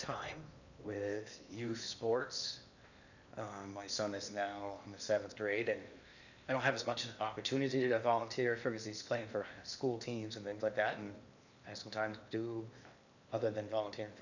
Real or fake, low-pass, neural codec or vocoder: fake; 7.2 kHz; codec, 16 kHz in and 24 kHz out, 0.8 kbps, FocalCodec, streaming, 65536 codes